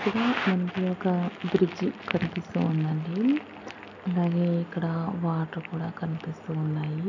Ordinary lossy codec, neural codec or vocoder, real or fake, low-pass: none; none; real; 7.2 kHz